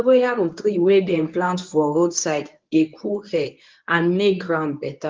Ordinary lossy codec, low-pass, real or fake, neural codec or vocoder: Opus, 32 kbps; 7.2 kHz; fake; codec, 24 kHz, 0.9 kbps, WavTokenizer, medium speech release version 1